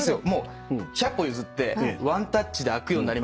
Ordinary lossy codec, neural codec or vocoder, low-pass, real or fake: none; none; none; real